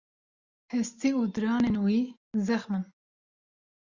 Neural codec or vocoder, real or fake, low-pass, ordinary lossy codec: none; real; 7.2 kHz; Opus, 64 kbps